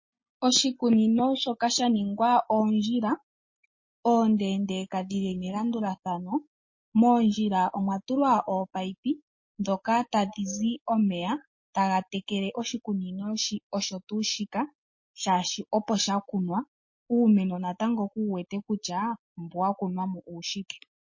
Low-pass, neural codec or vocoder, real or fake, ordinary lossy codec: 7.2 kHz; none; real; MP3, 32 kbps